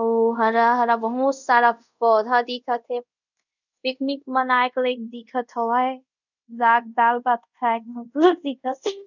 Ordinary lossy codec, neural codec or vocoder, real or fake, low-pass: none; codec, 24 kHz, 0.5 kbps, DualCodec; fake; 7.2 kHz